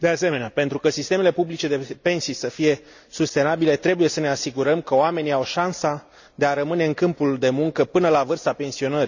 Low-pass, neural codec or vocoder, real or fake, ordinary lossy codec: 7.2 kHz; none; real; none